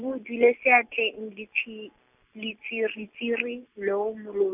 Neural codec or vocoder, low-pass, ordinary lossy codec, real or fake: none; 3.6 kHz; none; real